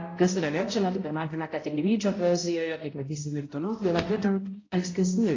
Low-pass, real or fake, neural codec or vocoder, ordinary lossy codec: 7.2 kHz; fake; codec, 16 kHz, 0.5 kbps, X-Codec, HuBERT features, trained on balanced general audio; AAC, 32 kbps